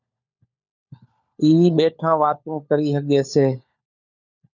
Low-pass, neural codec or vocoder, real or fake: 7.2 kHz; codec, 16 kHz, 4 kbps, FunCodec, trained on LibriTTS, 50 frames a second; fake